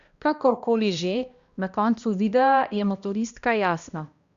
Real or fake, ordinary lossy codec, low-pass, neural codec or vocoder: fake; Opus, 64 kbps; 7.2 kHz; codec, 16 kHz, 1 kbps, X-Codec, HuBERT features, trained on balanced general audio